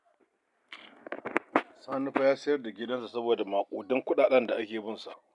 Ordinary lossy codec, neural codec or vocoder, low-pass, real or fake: none; none; 10.8 kHz; real